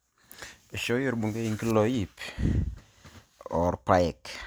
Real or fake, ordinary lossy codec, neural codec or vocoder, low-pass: real; none; none; none